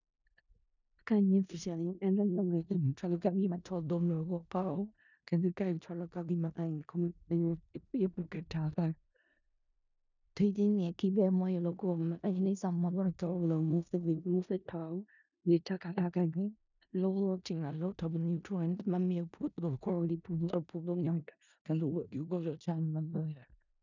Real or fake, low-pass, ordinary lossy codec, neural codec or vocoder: fake; 7.2 kHz; none; codec, 16 kHz in and 24 kHz out, 0.4 kbps, LongCat-Audio-Codec, four codebook decoder